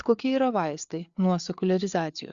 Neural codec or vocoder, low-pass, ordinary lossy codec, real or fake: codec, 16 kHz, 4 kbps, FreqCodec, larger model; 7.2 kHz; Opus, 64 kbps; fake